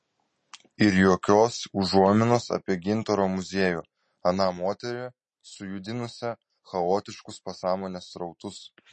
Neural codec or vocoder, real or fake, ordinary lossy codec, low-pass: none; real; MP3, 32 kbps; 10.8 kHz